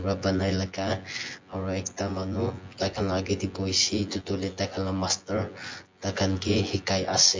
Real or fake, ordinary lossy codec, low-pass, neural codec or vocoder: fake; MP3, 48 kbps; 7.2 kHz; vocoder, 24 kHz, 100 mel bands, Vocos